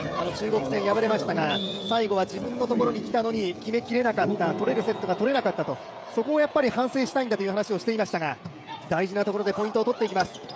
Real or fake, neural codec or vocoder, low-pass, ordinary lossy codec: fake; codec, 16 kHz, 16 kbps, FreqCodec, smaller model; none; none